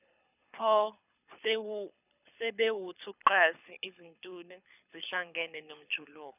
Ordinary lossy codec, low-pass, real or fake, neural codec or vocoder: none; 3.6 kHz; fake; codec, 24 kHz, 6 kbps, HILCodec